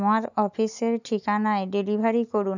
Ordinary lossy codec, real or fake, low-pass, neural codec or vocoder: none; real; 7.2 kHz; none